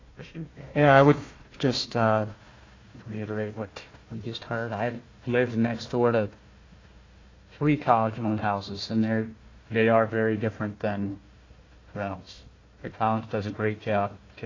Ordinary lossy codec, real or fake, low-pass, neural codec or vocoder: AAC, 32 kbps; fake; 7.2 kHz; codec, 16 kHz, 1 kbps, FunCodec, trained on Chinese and English, 50 frames a second